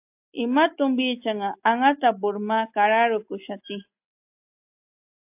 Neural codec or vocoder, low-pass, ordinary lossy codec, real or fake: none; 3.6 kHz; AAC, 32 kbps; real